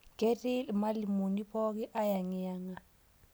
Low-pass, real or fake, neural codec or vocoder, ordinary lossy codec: none; real; none; none